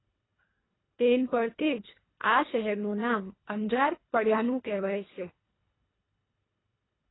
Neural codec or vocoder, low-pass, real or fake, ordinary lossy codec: codec, 24 kHz, 1.5 kbps, HILCodec; 7.2 kHz; fake; AAC, 16 kbps